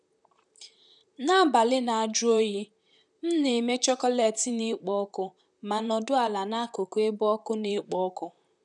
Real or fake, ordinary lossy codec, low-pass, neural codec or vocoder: fake; none; 10.8 kHz; vocoder, 44.1 kHz, 128 mel bands, Pupu-Vocoder